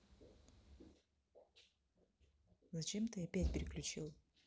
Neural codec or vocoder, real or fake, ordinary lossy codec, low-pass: none; real; none; none